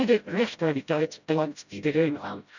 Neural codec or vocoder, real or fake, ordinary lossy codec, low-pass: codec, 16 kHz, 0.5 kbps, FreqCodec, smaller model; fake; none; 7.2 kHz